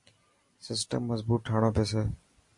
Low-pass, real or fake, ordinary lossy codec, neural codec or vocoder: 10.8 kHz; real; MP3, 48 kbps; none